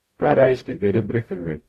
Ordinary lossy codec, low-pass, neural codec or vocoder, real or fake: AAC, 48 kbps; 14.4 kHz; codec, 44.1 kHz, 0.9 kbps, DAC; fake